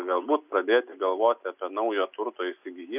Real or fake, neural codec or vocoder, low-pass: real; none; 3.6 kHz